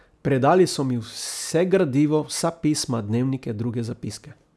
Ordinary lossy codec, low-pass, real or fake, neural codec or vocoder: none; none; real; none